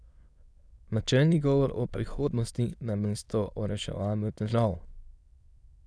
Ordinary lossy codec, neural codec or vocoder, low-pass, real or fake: none; autoencoder, 22.05 kHz, a latent of 192 numbers a frame, VITS, trained on many speakers; none; fake